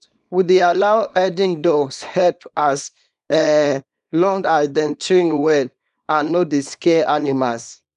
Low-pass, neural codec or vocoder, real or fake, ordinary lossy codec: 10.8 kHz; codec, 24 kHz, 0.9 kbps, WavTokenizer, small release; fake; none